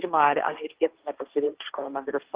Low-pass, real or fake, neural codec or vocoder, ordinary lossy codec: 3.6 kHz; fake; codec, 16 kHz, 1.1 kbps, Voila-Tokenizer; Opus, 16 kbps